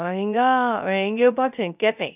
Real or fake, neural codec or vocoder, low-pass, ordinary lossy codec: fake; codec, 16 kHz, 0.3 kbps, FocalCodec; 3.6 kHz; none